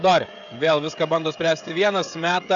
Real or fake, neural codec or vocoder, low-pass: fake; codec, 16 kHz, 16 kbps, FreqCodec, smaller model; 7.2 kHz